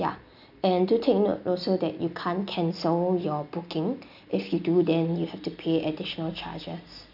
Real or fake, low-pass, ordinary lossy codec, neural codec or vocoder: real; 5.4 kHz; none; none